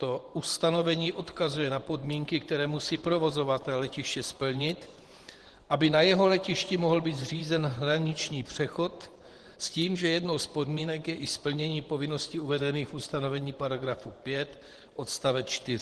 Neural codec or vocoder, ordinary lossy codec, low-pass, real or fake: vocoder, 24 kHz, 100 mel bands, Vocos; Opus, 16 kbps; 10.8 kHz; fake